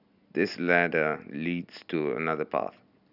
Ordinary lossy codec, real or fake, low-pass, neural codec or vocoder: none; real; 5.4 kHz; none